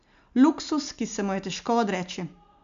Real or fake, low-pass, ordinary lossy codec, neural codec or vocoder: real; 7.2 kHz; MP3, 64 kbps; none